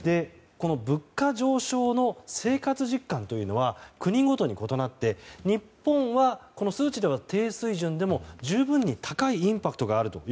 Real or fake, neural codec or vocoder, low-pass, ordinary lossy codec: real; none; none; none